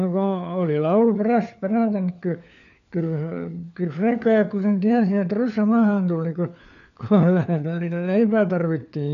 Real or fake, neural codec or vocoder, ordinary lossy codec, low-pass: fake; codec, 16 kHz, 4 kbps, FunCodec, trained on LibriTTS, 50 frames a second; none; 7.2 kHz